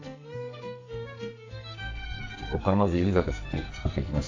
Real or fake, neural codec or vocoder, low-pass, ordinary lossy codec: fake; codec, 44.1 kHz, 2.6 kbps, SNAC; 7.2 kHz; AAC, 48 kbps